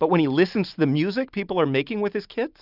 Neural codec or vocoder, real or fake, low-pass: none; real; 5.4 kHz